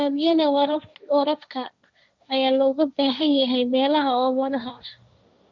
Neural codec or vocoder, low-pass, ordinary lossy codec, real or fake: codec, 16 kHz, 1.1 kbps, Voila-Tokenizer; none; none; fake